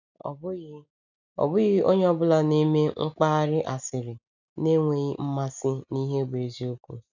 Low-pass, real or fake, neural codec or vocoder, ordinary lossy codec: 7.2 kHz; real; none; none